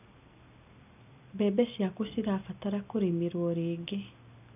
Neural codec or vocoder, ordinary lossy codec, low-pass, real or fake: none; none; 3.6 kHz; real